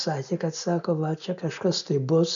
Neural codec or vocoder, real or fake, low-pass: none; real; 7.2 kHz